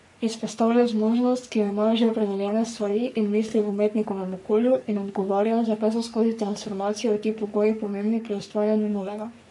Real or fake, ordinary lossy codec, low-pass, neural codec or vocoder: fake; none; 10.8 kHz; codec, 44.1 kHz, 3.4 kbps, Pupu-Codec